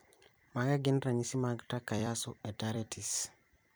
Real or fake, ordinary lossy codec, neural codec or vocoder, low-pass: fake; none; vocoder, 44.1 kHz, 128 mel bands every 256 samples, BigVGAN v2; none